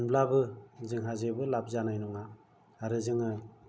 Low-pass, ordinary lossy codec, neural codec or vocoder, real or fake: none; none; none; real